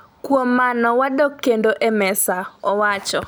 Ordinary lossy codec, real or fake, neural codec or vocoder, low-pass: none; real; none; none